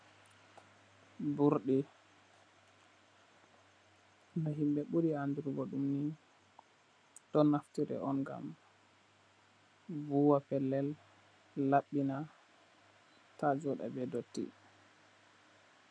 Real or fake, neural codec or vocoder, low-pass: real; none; 9.9 kHz